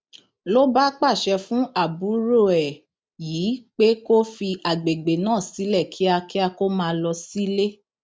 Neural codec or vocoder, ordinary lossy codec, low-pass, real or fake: none; none; none; real